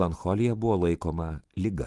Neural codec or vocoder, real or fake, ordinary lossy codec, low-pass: none; real; Opus, 24 kbps; 10.8 kHz